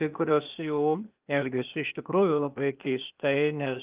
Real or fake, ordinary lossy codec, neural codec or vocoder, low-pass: fake; Opus, 32 kbps; codec, 16 kHz, 0.8 kbps, ZipCodec; 3.6 kHz